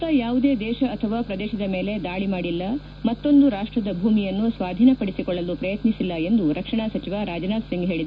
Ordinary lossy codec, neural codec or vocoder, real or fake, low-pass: none; none; real; none